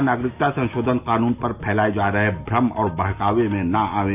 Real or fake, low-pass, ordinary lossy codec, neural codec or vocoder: real; 3.6 kHz; none; none